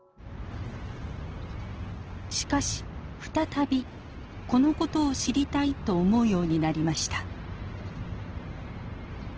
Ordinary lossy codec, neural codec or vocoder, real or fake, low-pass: Opus, 16 kbps; none; real; 7.2 kHz